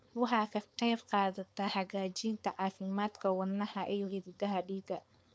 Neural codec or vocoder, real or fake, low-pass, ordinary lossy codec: codec, 16 kHz, 4.8 kbps, FACodec; fake; none; none